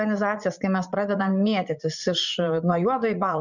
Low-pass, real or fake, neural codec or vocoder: 7.2 kHz; fake; vocoder, 44.1 kHz, 80 mel bands, Vocos